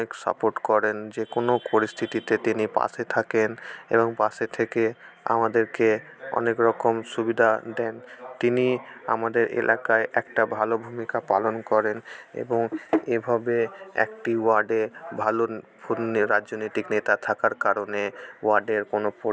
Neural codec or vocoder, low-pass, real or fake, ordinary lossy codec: none; none; real; none